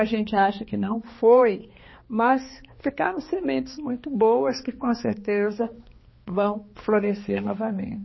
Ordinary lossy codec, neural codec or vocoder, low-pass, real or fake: MP3, 24 kbps; codec, 16 kHz, 2 kbps, X-Codec, HuBERT features, trained on balanced general audio; 7.2 kHz; fake